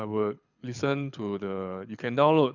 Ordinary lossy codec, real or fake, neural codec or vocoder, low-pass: none; fake; codec, 24 kHz, 6 kbps, HILCodec; 7.2 kHz